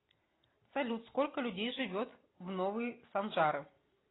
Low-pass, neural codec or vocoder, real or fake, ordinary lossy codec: 7.2 kHz; none; real; AAC, 16 kbps